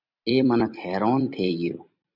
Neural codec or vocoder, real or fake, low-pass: vocoder, 44.1 kHz, 128 mel bands every 256 samples, BigVGAN v2; fake; 5.4 kHz